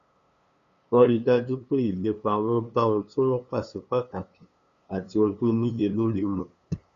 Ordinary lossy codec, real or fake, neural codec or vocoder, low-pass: Opus, 64 kbps; fake; codec, 16 kHz, 2 kbps, FunCodec, trained on LibriTTS, 25 frames a second; 7.2 kHz